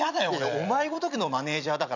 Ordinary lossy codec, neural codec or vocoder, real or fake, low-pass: none; vocoder, 44.1 kHz, 128 mel bands every 256 samples, BigVGAN v2; fake; 7.2 kHz